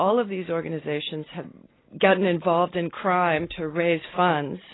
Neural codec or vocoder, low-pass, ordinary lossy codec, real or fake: none; 7.2 kHz; AAC, 16 kbps; real